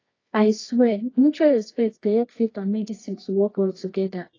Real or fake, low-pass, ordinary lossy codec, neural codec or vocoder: fake; 7.2 kHz; AAC, 32 kbps; codec, 24 kHz, 0.9 kbps, WavTokenizer, medium music audio release